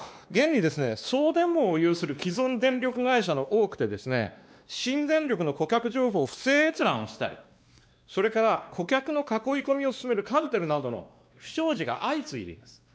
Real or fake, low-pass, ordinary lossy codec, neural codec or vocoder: fake; none; none; codec, 16 kHz, 2 kbps, X-Codec, WavLM features, trained on Multilingual LibriSpeech